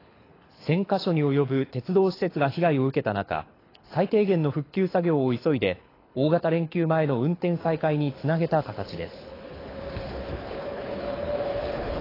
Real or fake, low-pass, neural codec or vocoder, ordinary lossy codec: fake; 5.4 kHz; codec, 24 kHz, 6 kbps, HILCodec; AAC, 24 kbps